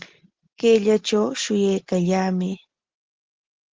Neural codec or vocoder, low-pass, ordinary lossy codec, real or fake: none; 7.2 kHz; Opus, 16 kbps; real